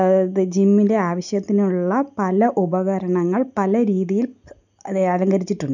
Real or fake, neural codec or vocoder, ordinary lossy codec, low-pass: real; none; none; 7.2 kHz